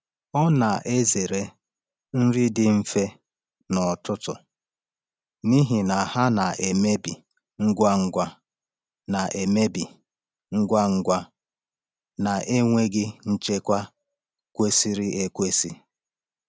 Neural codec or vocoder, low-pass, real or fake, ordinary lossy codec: none; none; real; none